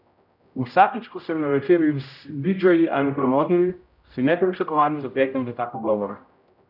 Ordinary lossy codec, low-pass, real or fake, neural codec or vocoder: none; 5.4 kHz; fake; codec, 16 kHz, 0.5 kbps, X-Codec, HuBERT features, trained on general audio